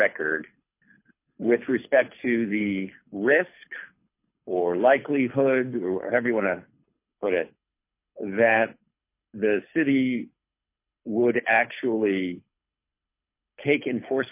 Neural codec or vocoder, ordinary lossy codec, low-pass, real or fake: codec, 24 kHz, 6 kbps, HILCodec; MP3, 24 kbps; 3.6 kHz; fake